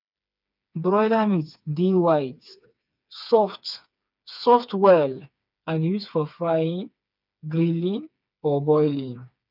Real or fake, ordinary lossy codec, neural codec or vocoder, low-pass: fake; none; codec, 16 kHz, 4 kbps, FreqCodec, smaller model; 5.4 kHz